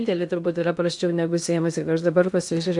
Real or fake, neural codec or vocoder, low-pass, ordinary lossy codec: fake; codec, 16 kHz in and 24 kHz out, 0.8 kbps, FocalCodec, streaming, 65536 codes; 10.8 kHz; MP3, 96 kbps